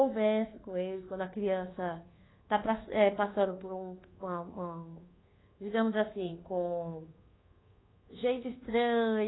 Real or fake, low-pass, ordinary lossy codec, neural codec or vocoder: fake; 7.2 kHz; AAC, 16 kbps; codec, 24 kHz, 1.2 kbps, DualCodec